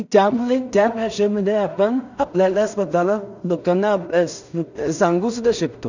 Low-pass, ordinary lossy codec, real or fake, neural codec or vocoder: 7.2 kHz; none; fake; codec, 16 kHz in and 24 kHz out, 0.4 kbps, LongCat-Audio-Codec, two codebook decoder